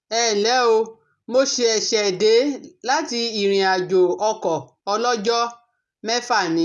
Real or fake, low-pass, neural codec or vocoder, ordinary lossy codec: real; none; none; none